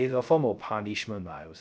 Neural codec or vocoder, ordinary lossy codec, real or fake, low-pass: codec, 16 kHz, 0.3 kbps, FocalCodec; none; fake; none